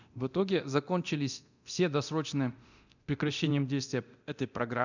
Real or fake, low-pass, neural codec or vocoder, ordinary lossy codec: fake; 7.2 kHz; codec, 24 kHz, 0.9 kbps, DualCodec; none